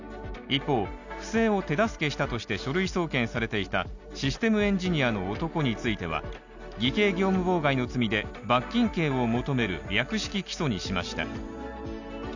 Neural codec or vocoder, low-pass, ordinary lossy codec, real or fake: none; 7.2 kHz; none; real